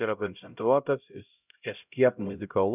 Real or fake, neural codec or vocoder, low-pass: fake; codec, 16 kHz, 0.5 kbps, X-Codec, HuBERT features, trained on LibriSpeech; 3.6 kHz